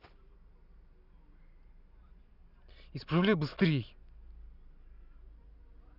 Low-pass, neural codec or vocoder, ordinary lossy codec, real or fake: 5.4 kHz; none; none; real